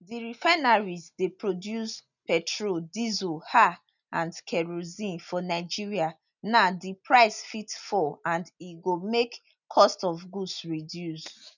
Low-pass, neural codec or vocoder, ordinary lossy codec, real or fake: 7.2 kHz; none; none; real